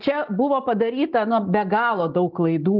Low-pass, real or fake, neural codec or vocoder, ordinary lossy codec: 5.4 kHz; real; none; Opus, 32 kbps